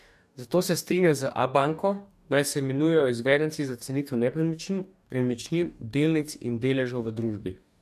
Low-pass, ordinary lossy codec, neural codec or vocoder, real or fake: 14.4 kHz; none; codec, 44.1 kHz, 2.6 kbps, DAC; fake